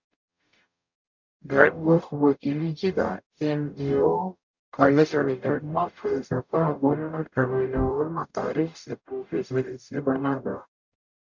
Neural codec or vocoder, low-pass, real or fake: codec, 44.1 kHz, 0.9 kbps, DAC; 7.2 kHz; fake